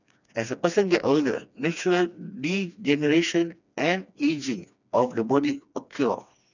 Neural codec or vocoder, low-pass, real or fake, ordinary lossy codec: codec, 16 kHz, 2 kbps, FreqCodec, smaller model; 7.2 kHz; fake; none